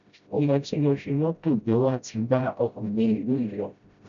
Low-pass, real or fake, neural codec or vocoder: 7.2 kHz; fake; codec, 16 kHz, 0.5 kbps, FreqCodec, smaller model